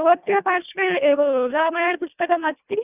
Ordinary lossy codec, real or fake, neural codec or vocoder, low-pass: none; fake; codec, 24 kHz, 1.5 kbps, HILCodec; 3.6 kHz